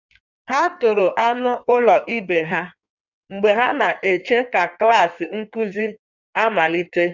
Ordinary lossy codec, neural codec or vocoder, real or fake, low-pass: none; codec, 16 kHz in and 24 kHz out, 1.1 kbps, FireRedTTS-2 codec; fake; 7.2 kHz